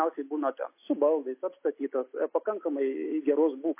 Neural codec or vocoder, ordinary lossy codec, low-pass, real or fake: none; MP3, 24 kbps; 3.6 kHz; real